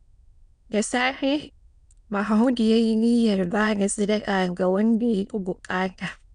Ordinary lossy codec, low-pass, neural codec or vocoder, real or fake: none; 9.9 kHz; autoencoder, 22.05 kHz, a latent of 192 numbers a frame, VITS, trained on many speakers; fake